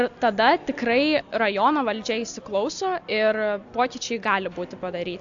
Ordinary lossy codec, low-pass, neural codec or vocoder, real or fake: AAC, 64 kbps; 7.2 kHz; none; real